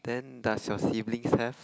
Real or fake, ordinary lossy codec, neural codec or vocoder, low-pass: real; none; none; none